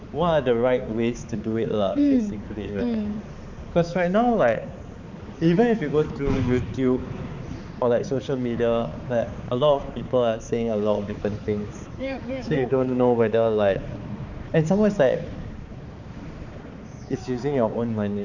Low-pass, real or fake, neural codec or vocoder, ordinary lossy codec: 7.2 kHz; fake; codec, 16 kHz, 4 kbps, X-Codec, HuBERT features, trained on balanced general audio; none